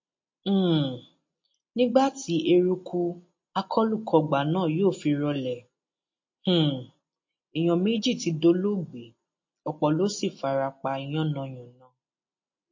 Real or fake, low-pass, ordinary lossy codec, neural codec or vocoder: real; 7.2 kHz; MP3, 32 kbps; none